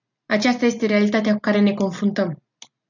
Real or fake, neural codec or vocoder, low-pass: real; none; 7.2 kHz